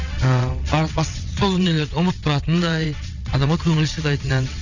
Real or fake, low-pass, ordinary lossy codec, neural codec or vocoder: fake; 7.2 kHz; none; vocoder, 22.05 kHz, 80 mel bands, WaveNeXt